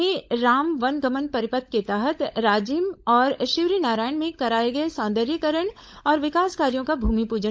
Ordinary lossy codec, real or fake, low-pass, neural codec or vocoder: none; fake; none; codec, 16 kHz, 16 kbps, FunCodec, trained on LibriTTS, 50 frames a second